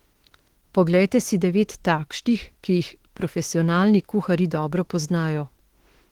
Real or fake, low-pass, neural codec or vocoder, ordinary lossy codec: fake; 19.8 kHz; autoencoder, 48 kHz, 32 numbers a frame, DAC-VAE, trained on Japanese speech; Opus, 16 kbps